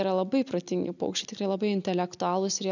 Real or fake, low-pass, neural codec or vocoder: real; 7.2 kHz; none